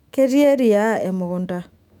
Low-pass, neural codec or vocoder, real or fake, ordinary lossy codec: 19.8 kHz; autoencoder, 48 kHz, 128 numbers a frame, DAC-VAE, trained on Japanese speech; fake; none